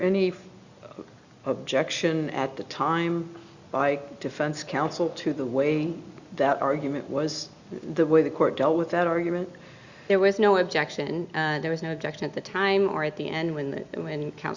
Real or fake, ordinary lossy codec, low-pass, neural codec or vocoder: real; Opus, 64 kbps; 7.2 kHz; none